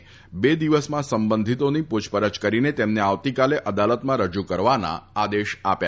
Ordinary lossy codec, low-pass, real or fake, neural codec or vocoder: none; none; real; none